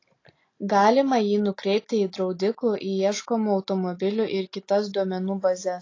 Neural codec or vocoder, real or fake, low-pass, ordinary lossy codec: none; real; 7.2 kHz; AAC, 32 kbps